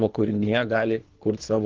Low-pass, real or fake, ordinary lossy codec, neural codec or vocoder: 7.2 kHz; fake; Opus, 16 kbps; codec, 24 kHz, 3 kbps, HILCodec